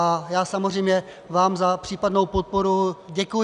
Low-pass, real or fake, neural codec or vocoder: 10.8 kHz; real; none